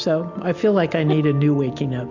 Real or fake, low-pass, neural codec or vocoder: real; 7.2 kHz; none